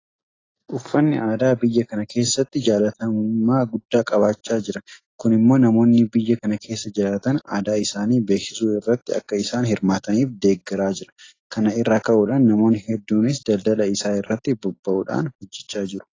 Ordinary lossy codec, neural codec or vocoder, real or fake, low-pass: AAC, 32 kbps; none; real; 7.2 kHz